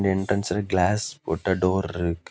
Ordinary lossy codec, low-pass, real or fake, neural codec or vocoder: none; none; real; none